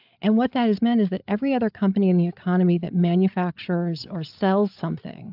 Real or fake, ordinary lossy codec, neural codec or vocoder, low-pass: fake; AAC, 48 kbps; codec, 16 kHz, 8 kbps, FreqCodec, larger model; 5.4 kHz